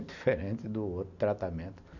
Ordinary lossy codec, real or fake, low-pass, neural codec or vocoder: none; real; 7.2 kHz; none